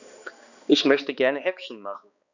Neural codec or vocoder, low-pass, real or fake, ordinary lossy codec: codec, 16 kHz, 2 kbps, X-Codec, HuBERT features, trained on balanced general audio; 7.2 kHz; fake; none